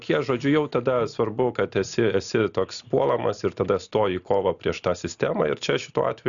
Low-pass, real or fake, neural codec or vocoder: 7.2 kHz; real; none